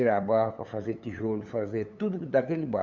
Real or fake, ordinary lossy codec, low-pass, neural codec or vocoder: fake; none; 7.2 kHz; codec, 16 kHz, 8 kbps, FunCodec, trained on LibriTTS, 25 frames a second